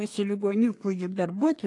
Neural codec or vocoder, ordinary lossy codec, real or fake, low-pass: codec, 24 kHz, 1 kbps, SNAC; AAC, 48 kbps; fake; 10.8 kHz